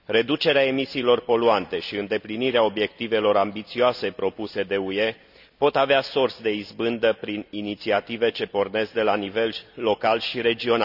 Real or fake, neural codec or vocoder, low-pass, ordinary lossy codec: real; none; 5.4 kHz; none